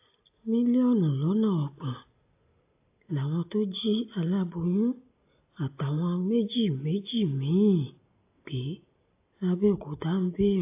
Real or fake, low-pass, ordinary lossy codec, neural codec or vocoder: real; 3.6 kHz; AAC, 24 kbps; none